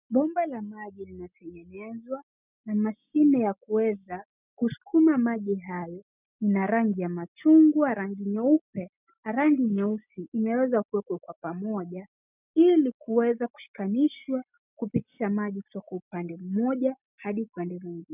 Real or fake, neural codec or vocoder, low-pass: real; none; 3.6 kHz